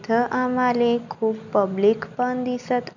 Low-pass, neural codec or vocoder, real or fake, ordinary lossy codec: 7.2 kHz; none; real; none